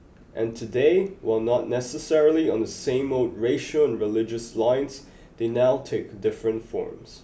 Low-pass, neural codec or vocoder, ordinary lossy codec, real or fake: none; none; none; real